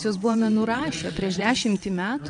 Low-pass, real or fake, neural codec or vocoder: 9.9 kHz; fake; vocoder, 22.05 kHz, 80 mel bands, WaveNeXt